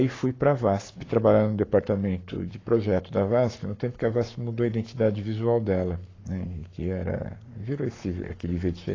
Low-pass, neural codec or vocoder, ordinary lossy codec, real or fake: 7.2 kHz; codec, 44.1 kHz, 7.8 kbps, Pupu-Codec; AAC, 32 kbps; fake